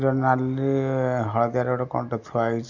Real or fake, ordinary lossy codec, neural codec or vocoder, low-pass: real; none; none; 7.2 kHz